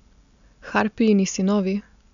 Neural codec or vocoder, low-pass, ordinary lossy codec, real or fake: none; 7.2 kHz; none; real